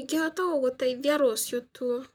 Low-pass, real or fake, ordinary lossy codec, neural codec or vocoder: none; fake; none; vocoder, 44.1 kHz, 128 mel bands, Pupu-Vocoder